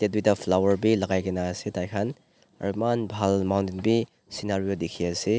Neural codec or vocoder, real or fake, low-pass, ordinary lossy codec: none; real; none; none